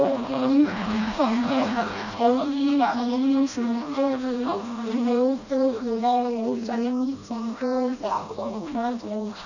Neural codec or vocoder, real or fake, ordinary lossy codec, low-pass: codec, 16 kHz, 1 kbps, FreqCodec, smaller model; fake; none; 7.2 kHz